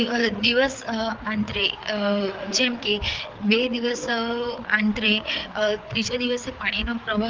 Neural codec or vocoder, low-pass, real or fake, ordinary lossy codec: codec, 16 kHz, 4 kbps, FreqCodec, larger model; 7.2 kHz; fake; Opus, 24 kbps